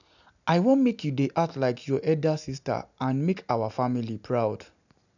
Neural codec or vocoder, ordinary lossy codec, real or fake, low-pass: none; none; real; 7.2 kHz